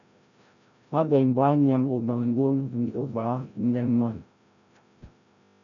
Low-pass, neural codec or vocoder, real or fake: 7.2 kHz; codec, 16 kHz, 0.5 kbps, FreqCodec, larger model; fake